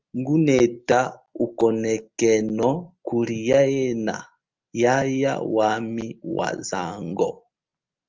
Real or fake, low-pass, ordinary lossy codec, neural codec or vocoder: real; 7.2 kHz; Opus, 24 kbps; none